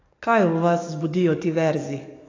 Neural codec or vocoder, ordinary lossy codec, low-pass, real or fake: codec, 44.1 kHz, 7.8 kbps, DAC; AAC, 32 kbps; 7.2 kHz; fake